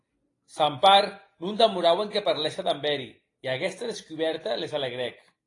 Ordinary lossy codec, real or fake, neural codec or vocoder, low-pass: AAC, 32 kbps; real; none; 10.8 kHz